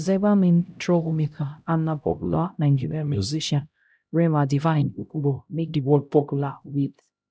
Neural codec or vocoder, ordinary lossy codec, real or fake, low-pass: codec, 16 kHz, 0.5 kbps, X-Codec, HuBERT features, trained on LibriSpeech; none; fake; none